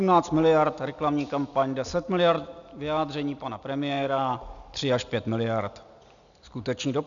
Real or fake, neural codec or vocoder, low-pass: real; none; 7.2 kHz